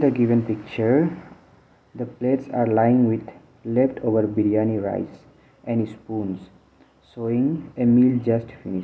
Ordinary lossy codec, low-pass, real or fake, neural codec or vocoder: none; none; real; none